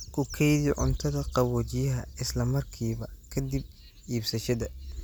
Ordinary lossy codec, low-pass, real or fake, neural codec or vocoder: none; none; real; none